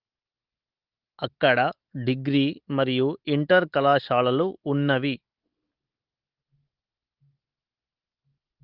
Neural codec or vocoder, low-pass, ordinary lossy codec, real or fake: none; 5.4 kHz; Opus, 16 kbps; real